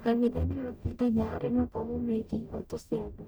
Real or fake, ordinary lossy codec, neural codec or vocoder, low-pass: fake; none; codec, 44.1 kHz, 0.9 kbps, DAC; none